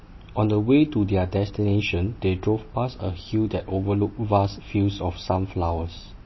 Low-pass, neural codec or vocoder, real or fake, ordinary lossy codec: 7.2 kHz; none; real; MP3, 24 kbps